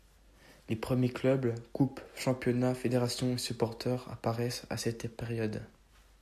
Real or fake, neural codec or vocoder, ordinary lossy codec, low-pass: real; none; AAC, 96 kbps; 14.4 kHz